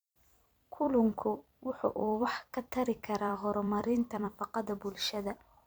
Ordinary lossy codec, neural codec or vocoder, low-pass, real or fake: none; vocoder, 44.1 kHz, 128 mel bands every 256 samples, BigVGAN v2; none; fake